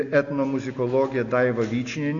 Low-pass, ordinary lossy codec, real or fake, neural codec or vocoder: 7.2 kHz; MP3, 48 kbps; real; none